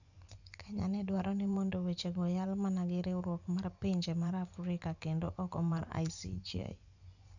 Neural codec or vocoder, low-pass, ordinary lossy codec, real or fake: vocoder, 24 kHz, 100 mel bands, Vocos; 7.2 kHz; none; fake